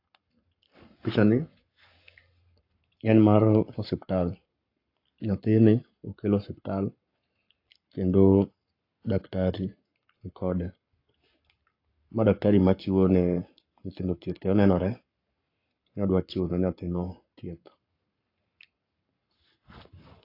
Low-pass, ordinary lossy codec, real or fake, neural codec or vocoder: 5.4 kHz; AAC, 32 kbps; fake; codec, 44.1 kHz, 7.8 kbps, Pupu-Codec